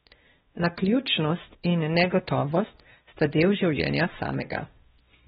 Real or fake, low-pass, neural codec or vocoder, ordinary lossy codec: fake; 7.2 kHz; codec, 16 kHz, 1 kbps, X-Codec, WavLM features, trained on Multilingual LibriSpeech; AAC, 16 kbps